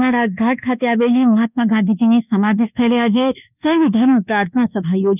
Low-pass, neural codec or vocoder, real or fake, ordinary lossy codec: 3.6 kHz; codec, 24 kHz, 1.2 kbps, DualCodec; fake; none